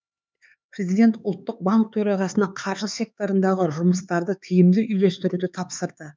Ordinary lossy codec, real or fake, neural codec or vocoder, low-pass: none; fake; codec, 16 kHz, 4 kbps, X-Codec, HuBERT features, trained on LibriSpeech; none